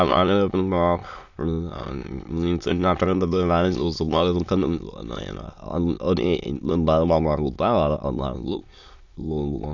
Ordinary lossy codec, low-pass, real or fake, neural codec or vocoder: none; 7.2 kHz; fake; autoencoder, 22.05 kHz, a latent of 192 numbers a frame, VITS, trained on many speakers